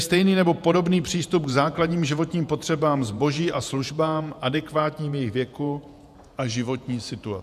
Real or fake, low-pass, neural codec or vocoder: fake; 14.4 kHz; vocoder, 48 kHz, 128 mel bands, Vocos